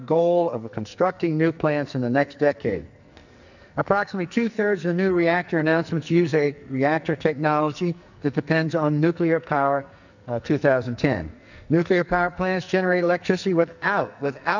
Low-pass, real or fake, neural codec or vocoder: 7.2 kHz; fake; codec, 44.1 kHz, 2.6 kbps, SNAC